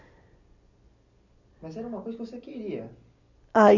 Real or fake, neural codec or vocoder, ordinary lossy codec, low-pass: real; none; none; 7.2 kHz